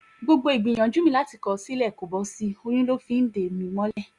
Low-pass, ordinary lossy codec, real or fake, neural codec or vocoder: 10.8 kHz; Opus, 64 kbps; real; none